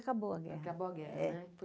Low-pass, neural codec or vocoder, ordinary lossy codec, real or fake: none; none; none; real